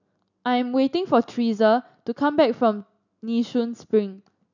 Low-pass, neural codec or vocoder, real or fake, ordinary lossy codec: 7.2 kHz; none; real; none